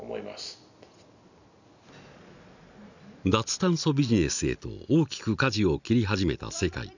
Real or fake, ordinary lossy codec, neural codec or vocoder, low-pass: real; none; none; 7.2 kHz